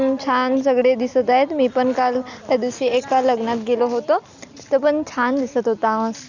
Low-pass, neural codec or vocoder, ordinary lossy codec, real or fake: 7.2 kHz; none; none; real